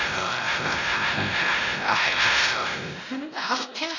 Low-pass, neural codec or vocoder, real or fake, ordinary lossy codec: 7.2 kHz; codec, 16 kHz, 0.5 kbps, X-Codec, WavLM features, trained on Multilingual LibriSpeech; fake; none